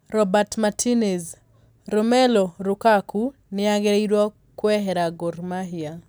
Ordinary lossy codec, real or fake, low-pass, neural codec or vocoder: none; real; none; none